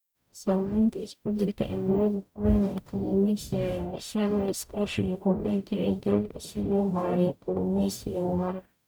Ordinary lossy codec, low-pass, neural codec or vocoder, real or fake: none; none; codec, 44.1 kHz, 0.9 kbps, DAC; fake